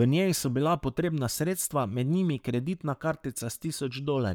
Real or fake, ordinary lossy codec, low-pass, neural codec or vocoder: fake; none; none; codec, 44.1 kHz, 7.8 kbps, Pupu-Codec